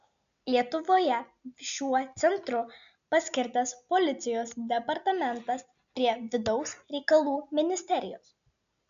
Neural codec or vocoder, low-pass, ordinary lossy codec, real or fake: none; 7.2 kHz; MP3, 96 kbps; real